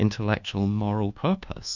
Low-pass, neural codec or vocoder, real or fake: 7.2 kHz; codec, 24 kHz, 1.2 kbps, DualCodec; fake